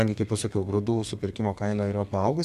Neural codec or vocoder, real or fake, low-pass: codec, 32 kHz, 1.9 kbps, SNAC; fake; 14.4 kHz